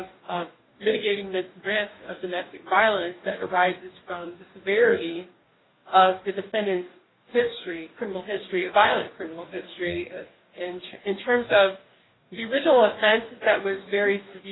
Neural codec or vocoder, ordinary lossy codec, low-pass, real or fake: codec, 44.1 kHz, 2.6 kbps, DAC; AAC, 16 kbps; 7.2 kHz; fake